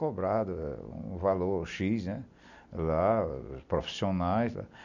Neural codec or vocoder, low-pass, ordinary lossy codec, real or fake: none; 7.2 kHz; none; real